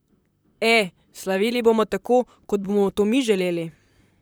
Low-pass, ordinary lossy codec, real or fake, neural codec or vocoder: none; none; fake; vocoder, 44.1 kHz, 128 mel bands, Pupu-Vocoder